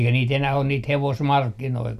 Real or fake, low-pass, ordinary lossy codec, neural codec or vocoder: real; 14.4 kHz; none; none